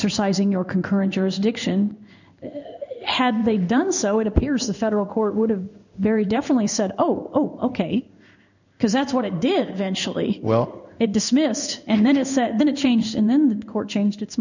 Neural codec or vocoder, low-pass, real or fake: codec, 16 kHz in and 24 kHz out, 1 kbps, XY-Tokenizer; 7.2 kHz; fake